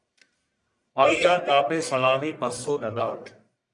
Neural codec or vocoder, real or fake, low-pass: codec, 44.1 kHz, 1.7 kbps, Pupu-Codec; fake; 10.8 kHz